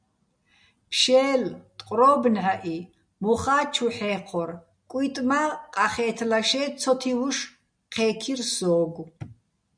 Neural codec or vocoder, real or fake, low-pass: none; real; 9.9 kHz